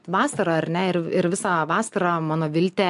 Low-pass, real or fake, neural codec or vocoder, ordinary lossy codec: 10.8 kHz; real; none; MP3, 64 kbps